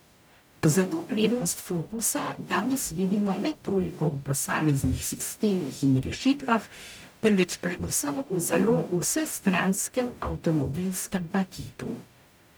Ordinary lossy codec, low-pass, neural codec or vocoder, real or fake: none; none; codec, 44.1 kHz, 0.9 kbps, DAC; fake